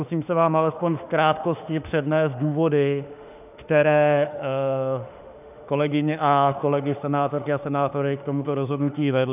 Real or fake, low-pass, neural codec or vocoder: fake; 3.6 kHz; autoencoder, 48 kHz, 32 numbers a frame, DAC-VAE, trained on Japanese speech